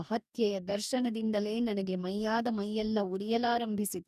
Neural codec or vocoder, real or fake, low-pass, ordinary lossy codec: codec, 44.1 kHz, 2.6 kbps, DAC; fake; 14.4 kHz; none